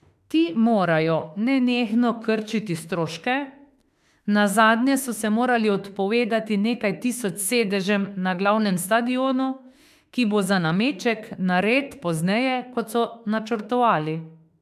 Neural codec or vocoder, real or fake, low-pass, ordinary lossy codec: autoencoder, 48 kHz, 32 numbers a frame, DAC-VAE, trained on Japanese speech; fake; 14.4 kHz; AAC, 96 kbps